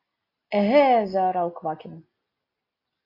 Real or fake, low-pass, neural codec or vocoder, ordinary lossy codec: real; 5.4 kHz; none; AAC, 32 kbps